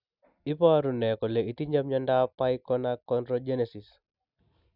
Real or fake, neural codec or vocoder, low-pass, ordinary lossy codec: real; none; 5.4 kHz; none